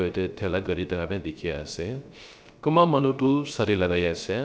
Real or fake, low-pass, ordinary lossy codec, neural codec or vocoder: fake; none; none; codec, 16 kHz, 0.3 kbps, FocalCodec